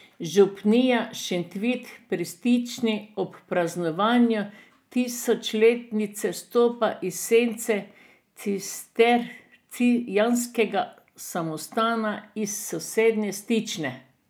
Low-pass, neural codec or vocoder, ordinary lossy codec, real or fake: none; none; none; real